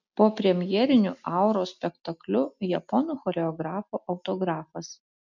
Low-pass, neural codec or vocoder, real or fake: 7.2 kHz; none; real